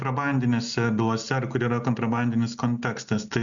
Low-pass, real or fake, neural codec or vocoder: 7.2 kHz; real; none